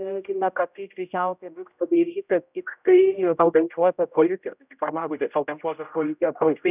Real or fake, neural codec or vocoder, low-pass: fake; codec, 16 kHz, 0.5 kbps, X-Codec, HuBERT features, trained on general audio; 3.6 kHz